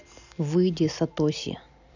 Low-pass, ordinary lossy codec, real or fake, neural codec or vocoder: 7.2 kHz; none; real; none